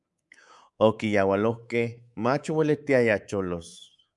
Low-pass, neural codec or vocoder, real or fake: 10.8 kHz; codec, 24 kHz, 3.1 kbps, DualCodec; fake